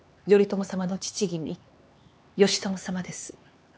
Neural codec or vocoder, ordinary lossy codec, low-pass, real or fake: codec, 16 kHz, 4 kbps, X-Codec, HuBERT features, trained on LibriSpeech; none; none; fake